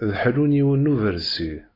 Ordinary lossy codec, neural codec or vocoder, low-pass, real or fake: AAC, 24 kbps; none; 5.4 kHz; real